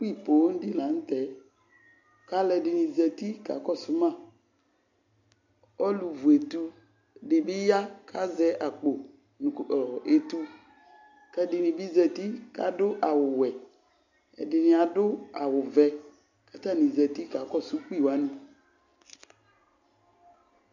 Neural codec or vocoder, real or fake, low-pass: none; real; 7.2 kHz